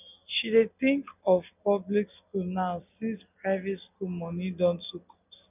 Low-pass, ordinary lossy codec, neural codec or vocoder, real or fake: 3.6 kHz; none; none; real